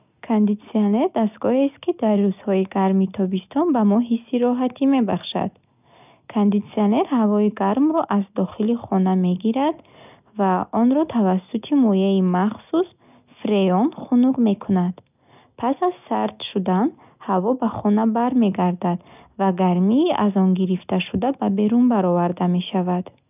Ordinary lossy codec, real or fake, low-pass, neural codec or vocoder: none; real; 3.6 kHz; none